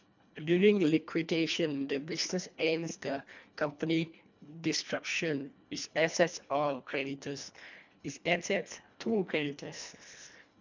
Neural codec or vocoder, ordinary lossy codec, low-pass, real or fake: codec, 24 kHz, 1.5 kbps, HILCodec; MP3, 64 kbps; 7.2 kHz; fake